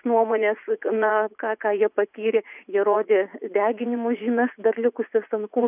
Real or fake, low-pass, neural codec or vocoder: fake; 3.6 kHz; vocoder, 44.1 kHz, 80 mel bands, Vocos